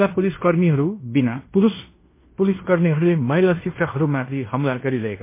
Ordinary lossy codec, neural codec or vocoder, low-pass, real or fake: MP3, 24 kbps; codec, 16 kHz in and 24 kHz out, 0.9 kbps, LongCat-Audio-Codec, fine tuned four codebook decoder; 3.6 kHz; fake